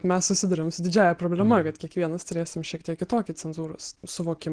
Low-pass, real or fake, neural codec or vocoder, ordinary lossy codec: 9.9 kHz; real; none; Opus, 16 kbps